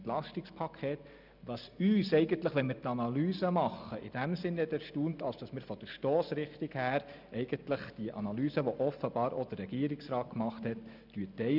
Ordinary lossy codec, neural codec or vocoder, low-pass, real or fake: none; none; 5.4 kHz; real